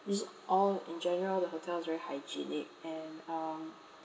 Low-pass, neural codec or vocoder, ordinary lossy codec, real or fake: none; none; none; real